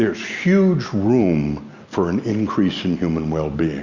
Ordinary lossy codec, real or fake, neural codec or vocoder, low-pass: Opus, 64 kbps; real; none; 7.2 kHz